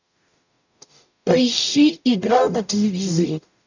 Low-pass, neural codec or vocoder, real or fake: 7.2 kHz; codec, 44.1 kHz, 0.9 kbps, DAC; fake